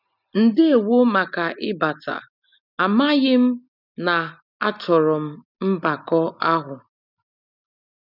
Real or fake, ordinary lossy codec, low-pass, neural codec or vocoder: real; none; 5.4 kHz; none